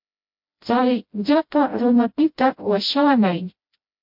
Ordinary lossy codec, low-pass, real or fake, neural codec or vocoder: MP3, 48 kbps; 5.4 kHz; fake; codec, 16 kHz, 0.5 kbps, FreqCodec, smaller model